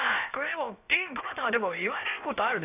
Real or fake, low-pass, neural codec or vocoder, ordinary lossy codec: fake; 3.6 kHz; codec, 16 kHz, about 1 kbps, DyCAST, with the encoder's durations; AAC, 24 kbps